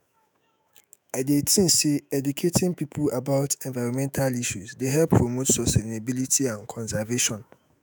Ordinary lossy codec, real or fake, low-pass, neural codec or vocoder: none; fake; none; autoencoder, 48 kHz, 128 numbers a frame, DAC-VAE, trained on Japanese speech